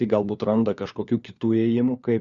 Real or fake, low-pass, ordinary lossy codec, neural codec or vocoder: fake; 7.2 kHz; Opus, 64 kbps; codec, 16 kHz, 4 kbps, FunCodec, trained on LibriTTS, 50 frames a second